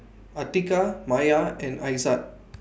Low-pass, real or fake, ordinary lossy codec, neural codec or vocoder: none; real; none; none